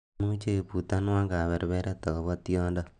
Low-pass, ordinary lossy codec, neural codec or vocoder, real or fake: 9.9 kHz; none; none; real